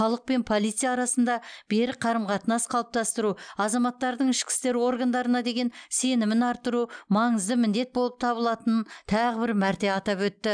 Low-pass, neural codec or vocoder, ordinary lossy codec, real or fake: 9.9 kHz; none; none; real